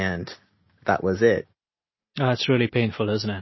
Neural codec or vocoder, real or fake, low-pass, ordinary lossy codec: none; real; 7.2 kHz; MP3, 24 kbps